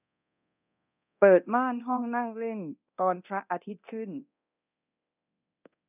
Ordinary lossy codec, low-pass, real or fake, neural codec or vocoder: none; 3.6 kHz; fake; codec, 24 kHz, 0.9 kbps, DualCodec